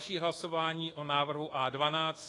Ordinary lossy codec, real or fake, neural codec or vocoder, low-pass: AAC, 32 kbps; fake; codec, 24 kHz, 1.2 kbps, DualCodec; 10.8 kHz